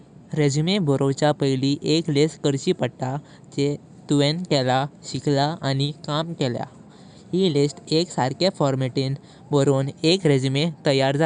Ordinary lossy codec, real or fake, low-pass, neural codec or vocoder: none; real; 10.8 kHz; none